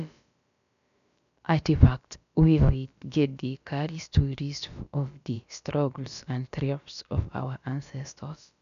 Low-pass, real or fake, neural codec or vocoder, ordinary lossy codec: 7.2 kHz; fake; codec, 16 kHz, about 1 kbps, DyCAST, with the encoder's durations; none